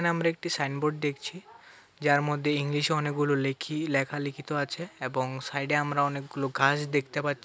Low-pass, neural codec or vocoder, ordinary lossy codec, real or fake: none; none; none; real